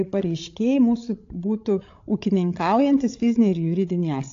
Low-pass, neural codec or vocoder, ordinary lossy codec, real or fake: 7.2 kHz; codec, 16 kHz, 16 kbps, FreqCodec, larger model; AAC, 48 kbps; fake